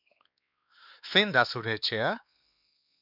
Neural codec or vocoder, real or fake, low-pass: codec, 16 kHz, 2 kbps, X-Codec, WavLM features, trained on Multilingual LibriSpeech; fake; 5.4 kHz